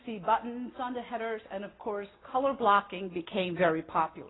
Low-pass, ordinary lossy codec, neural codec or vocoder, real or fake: 7.2 kHz; AAC, 16 kbps; none; real